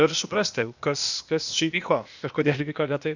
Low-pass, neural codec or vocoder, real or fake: 7.2 kHz; codec, 16 kHz, 0.8 kbps, ZipCodec; fake